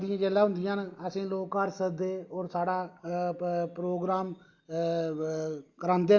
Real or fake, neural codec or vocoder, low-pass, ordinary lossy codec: real; none; 7.2 kHz; Opus, 64 kbps